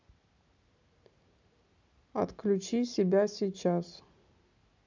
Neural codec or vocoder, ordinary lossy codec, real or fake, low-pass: none; none; real; 7.2 kHz